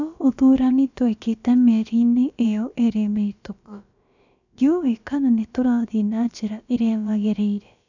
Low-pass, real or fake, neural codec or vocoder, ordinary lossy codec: 7.2 kHz; fake; codec, 16 kHz, about 1 kbps, DyCAST, with the encoder's durations; none